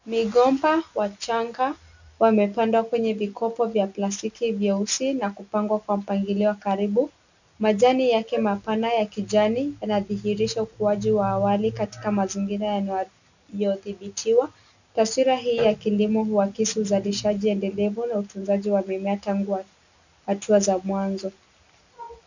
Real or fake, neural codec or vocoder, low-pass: real; none; 7.2 kHz